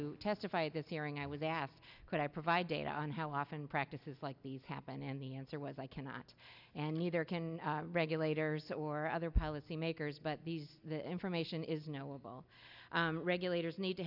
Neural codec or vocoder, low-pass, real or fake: none; 5.4 kHz; real